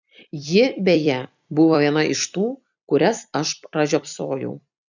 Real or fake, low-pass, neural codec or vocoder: fake; 7.2 kHz; vocoder, 44.1 kHz, 128 mel bands every 256 samples, BigVGAN v2